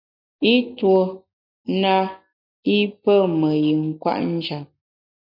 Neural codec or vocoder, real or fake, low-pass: none; real; 5.4 kHz